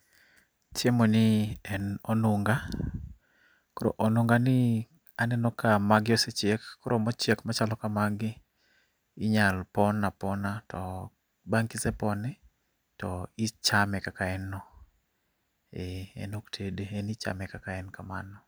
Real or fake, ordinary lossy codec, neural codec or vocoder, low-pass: real; none; none; none